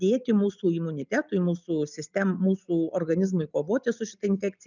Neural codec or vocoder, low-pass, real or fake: none; 7.2 kHz; real